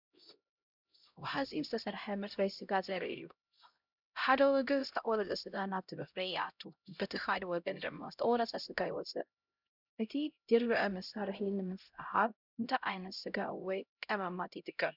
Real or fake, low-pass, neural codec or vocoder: fake; 5.4 kHz; codec, 16 kHz, 0.5 kbps, X-Codec, HuBERT features, trained on LibriSpeech